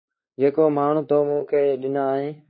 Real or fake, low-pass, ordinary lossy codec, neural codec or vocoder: fake; 7.2 kHz; MP3, 24 kbps; codec, 16 kHz, 4 kbps, X-Codec, WavLM features, trained on Multilingual LibriSpeech